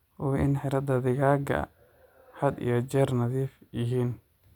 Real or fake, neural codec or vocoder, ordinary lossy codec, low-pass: real; none; none; 19.8 kHz